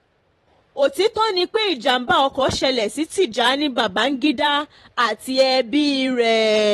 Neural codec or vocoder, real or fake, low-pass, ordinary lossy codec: vocoder, 44.1 kHz, 128 mel bands every 512 samples, BigVGAN v2; fake; 19.8 kHz; AAC, 32 kbps